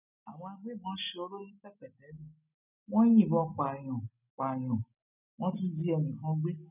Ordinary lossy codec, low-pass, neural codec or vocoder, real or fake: none; 3.6 kHz; none; real